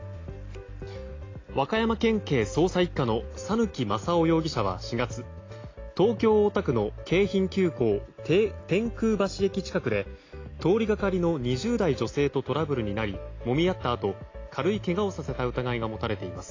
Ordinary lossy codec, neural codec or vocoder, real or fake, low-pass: AAC, 32 kbps; none; real; 7.2 kHz